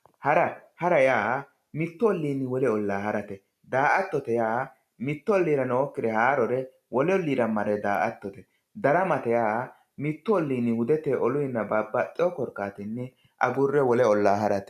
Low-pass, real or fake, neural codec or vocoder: 14.4 kHz; real; none